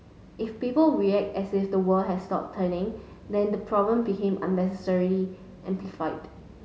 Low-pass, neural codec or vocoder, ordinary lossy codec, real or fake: none; none; none; real